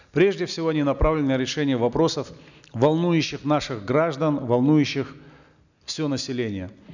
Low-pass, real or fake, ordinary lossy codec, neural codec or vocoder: 7.2 kHz; real; none; none